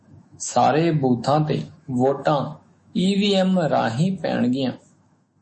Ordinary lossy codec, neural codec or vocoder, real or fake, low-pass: MP3, 32 kbps; autoencoder, 48 kHz, 128 numbers a frame, DAC-VAE, trained on Japanese speech; fake; 10.8 kHz